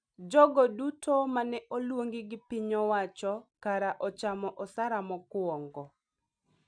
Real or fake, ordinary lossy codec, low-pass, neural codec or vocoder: real; none; 9.9 kHz; none